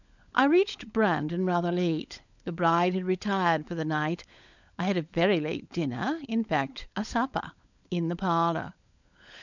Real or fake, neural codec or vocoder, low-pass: fake; codec, 16 kHz, 16 kbps, FunCodec, trained on LibriTTS, 50 frames a second; 7.2 kHz